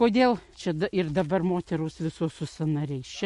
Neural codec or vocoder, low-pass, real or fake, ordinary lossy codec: none; 14.4 kHz; real; MP3, 48 kbps